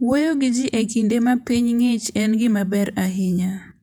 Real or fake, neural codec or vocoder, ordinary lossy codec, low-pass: fake; vocoder, 48 kHz, 128 mel bands, Vocos; none; 19.8 kHz